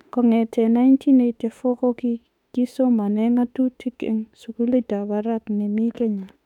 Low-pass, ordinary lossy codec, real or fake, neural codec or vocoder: 19.8 kHz; none; fake; autoencoder, 48 kHz, 32 numbers a frame, DAC-VAE, trained on Japanese speech